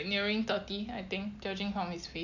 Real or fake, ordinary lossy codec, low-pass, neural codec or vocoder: real; none; 7.2 kHz; none